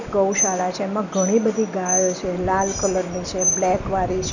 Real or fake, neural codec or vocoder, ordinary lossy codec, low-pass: real; none; none; 7.2 kHz